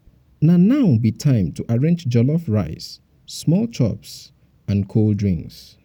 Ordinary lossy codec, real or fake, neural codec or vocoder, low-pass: none; real; none; 19.8 kHz